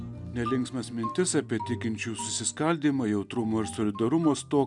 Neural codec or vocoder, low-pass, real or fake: none; 10.8 kHz; real